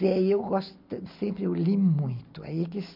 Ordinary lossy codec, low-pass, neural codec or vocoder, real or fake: MP3, 48 kbps; 5.4 kHz; none; real